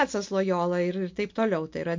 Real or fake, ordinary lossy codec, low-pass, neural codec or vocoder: real; MP3, 48 kbps; 7.2 kHz; none